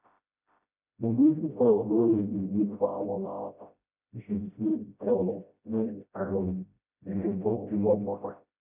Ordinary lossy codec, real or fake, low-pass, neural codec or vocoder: none; fake; 3.6 kHz; codec, 16 kHz, 0.5 kbps, FreqCodec, smaller model